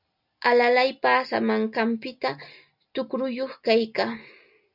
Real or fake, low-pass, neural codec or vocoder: real; 5.4 kHz; none